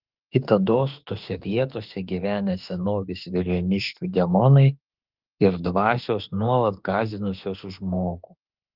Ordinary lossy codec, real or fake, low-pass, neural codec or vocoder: Opus, 32 kbps; fake; 5.4 kHz; autoencoder, 48 kHz, 32 numbers a frame, DAC-VAE, trained on Japanese speech